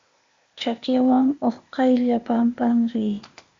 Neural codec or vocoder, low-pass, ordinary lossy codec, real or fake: codec, 16 kHz, 0.8 kbps, ZipCodec; 7.2 kHz; MP3, 48 kbps; fake